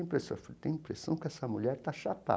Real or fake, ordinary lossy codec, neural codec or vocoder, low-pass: real; none; none; none